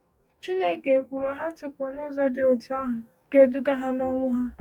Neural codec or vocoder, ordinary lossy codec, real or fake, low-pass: codec, 44.1 kHz, 2.6 kbps, DAC; none; fake; 19.8 kHz